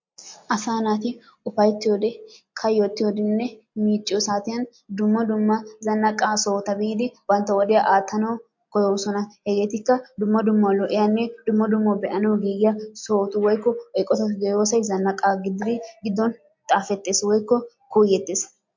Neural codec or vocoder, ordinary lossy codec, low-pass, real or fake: none; MP3, 48 kbps; 7.2 kHz; real